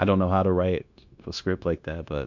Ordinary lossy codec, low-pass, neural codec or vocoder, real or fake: MP3, 64 kbps; 7.2 kHz; codec, 16 kHz, 0.9 kbps, LongCat-Audio-Codec; fake